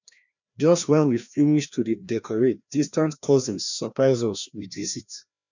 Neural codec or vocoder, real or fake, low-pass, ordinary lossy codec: codec, 16 kHz, 1 kbps, FreqCodec, larger model; fake; 7.2 kHz; none